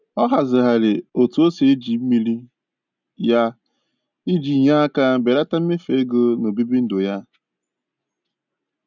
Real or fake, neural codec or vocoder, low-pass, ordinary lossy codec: real; none; 7.2 kHz; none